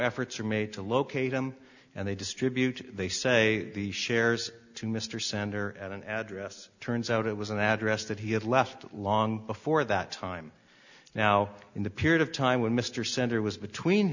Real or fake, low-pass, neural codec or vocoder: real; 7.2 kHz; none